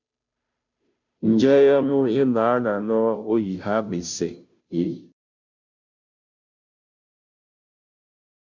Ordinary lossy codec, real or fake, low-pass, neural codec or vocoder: MP3, 64 kbps; fake; 7.2 kHz; codec, 16 kHz, 0.5 kbps, FunCodec, trained on Chinese and English, 25 frames a second